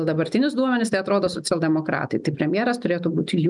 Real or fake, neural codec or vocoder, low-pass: real; none; 10.8 kHz